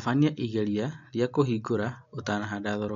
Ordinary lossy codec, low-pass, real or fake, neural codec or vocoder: MP3, 64 kbps; 7.2 kHz; real; none